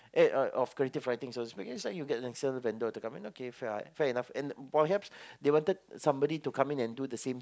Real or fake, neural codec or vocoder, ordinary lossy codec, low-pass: real; none; none; none